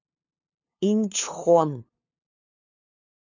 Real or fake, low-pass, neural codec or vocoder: fake; 7.2 kHz; codec, 16 kHz, 2 kbps, FunCodec, trained on LibriTTS, 25 frames a second